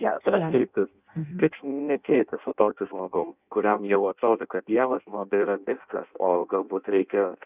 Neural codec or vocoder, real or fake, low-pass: codec, 16 kHz in and 24 kHz out, 0.6 kbps, FireRedTTS-2 codec; fake; 3.6 kHz